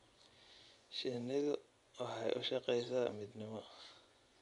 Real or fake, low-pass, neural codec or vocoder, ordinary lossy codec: fake; 10.8 kHz; vocoder, 24 kHz, 100 mel bands, Vocos; none